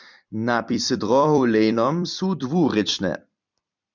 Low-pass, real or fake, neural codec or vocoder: 7.2 kHz; fake; vocoder, 44.1 kHz, 128 mel bands every 256 samples, BigVGAN v2